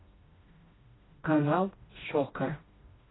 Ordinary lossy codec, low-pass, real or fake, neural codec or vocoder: AAC, 16 kbps; 7.2 kHz; fake; codec, 16 kHz, 1 kbps, FreqCodec, smaller model